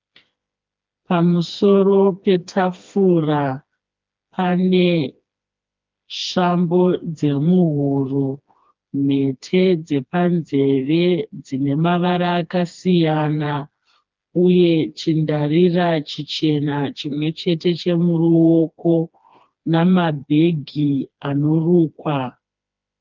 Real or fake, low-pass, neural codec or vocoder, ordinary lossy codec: fake; 7.2 kHz; codec, 16 kHz, 2 kbps, FreqCodec, smaller model; Opus, 24 kbps